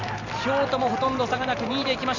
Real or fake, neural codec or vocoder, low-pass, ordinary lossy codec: real; none; 7.2 kHz; none